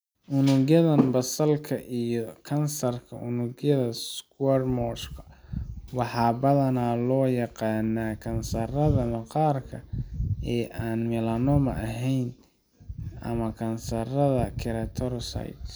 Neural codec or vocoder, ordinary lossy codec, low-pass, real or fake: none; none; none; real